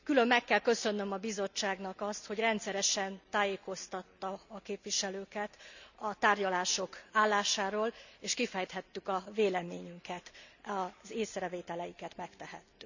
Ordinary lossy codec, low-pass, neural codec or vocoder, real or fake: none; 7.2 kHz; none; real